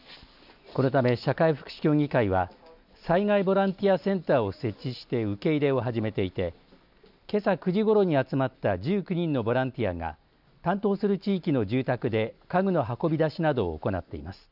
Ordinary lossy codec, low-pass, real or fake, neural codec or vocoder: none; 5.4 kHz; real; none